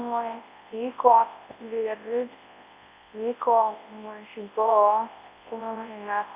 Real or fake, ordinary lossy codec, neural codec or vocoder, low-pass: fake; Opus, 64 kbps; codec, 24 kHz, 0.9 kbps, WavTokenizer, large speech release; 3.6 kHz